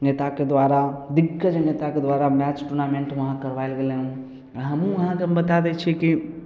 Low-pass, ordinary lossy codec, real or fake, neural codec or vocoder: none; none; real; none